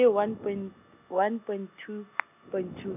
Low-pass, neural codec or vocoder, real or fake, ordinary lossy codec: 3.6 kHz; none; real; none